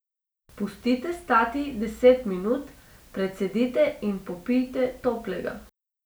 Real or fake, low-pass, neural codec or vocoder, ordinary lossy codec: real; none; none; none